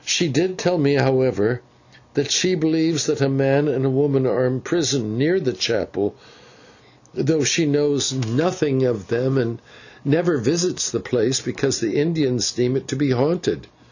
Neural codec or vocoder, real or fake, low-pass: none; real; 7.2 kHz